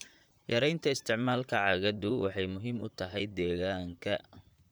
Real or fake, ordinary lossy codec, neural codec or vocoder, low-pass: fake; none; vocoder, 44.1 kHz, 128 mel bands, Pupu-Vocoder; none